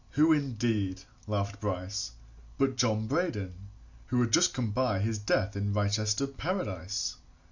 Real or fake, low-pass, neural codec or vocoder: real; 7.2 kHz; none